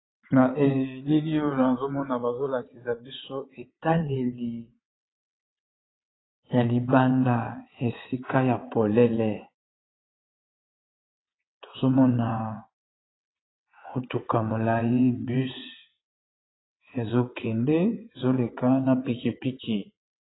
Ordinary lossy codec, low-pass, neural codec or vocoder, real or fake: AAC, 16 kbps; 7.2 kHz; vocoder, 22.05 kHz, 80 mel bands, WaveNeXt; fake